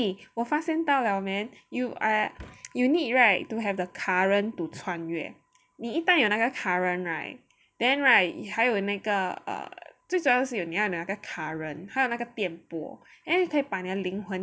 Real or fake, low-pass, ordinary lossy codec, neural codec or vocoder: real; none; none; none